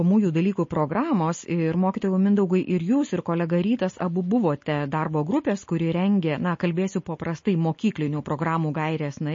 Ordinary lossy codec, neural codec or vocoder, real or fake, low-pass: MP3, 32 kbps; none; real; 7.2 kHz